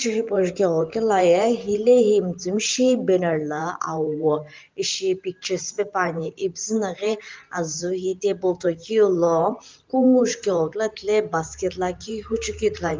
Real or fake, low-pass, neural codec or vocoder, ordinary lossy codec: fake; 7.2 kHz; vocoder, 44.1 kHz, 128 mel bands every 512 samples, BigVGAN v2; Opus, 32 kbps